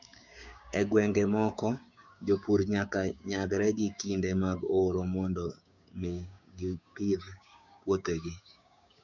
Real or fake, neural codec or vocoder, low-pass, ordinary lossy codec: fake; codec, 16 kHz, 6 kbps, DAC; 7.2 kHz; none